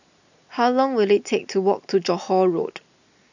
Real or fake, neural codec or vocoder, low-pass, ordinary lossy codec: real; none; 7.2 kHz; none